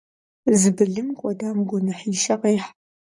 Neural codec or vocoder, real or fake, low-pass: vocoder, 44.1 kHz, 128 mel bands, Pupu-Vocoder; fake; 10.8 kHz